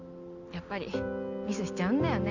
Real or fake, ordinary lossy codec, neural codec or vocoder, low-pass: real; none; none; 7.2 kHz